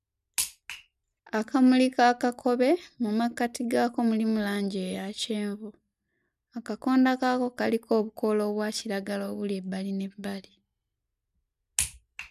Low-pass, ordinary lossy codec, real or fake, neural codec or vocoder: 14.4 kHz; none; real; none